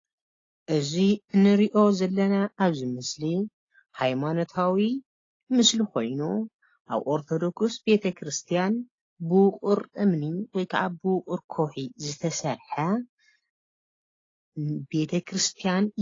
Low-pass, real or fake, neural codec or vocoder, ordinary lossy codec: 7.2 kHz; real; none; AAC, 32 kbps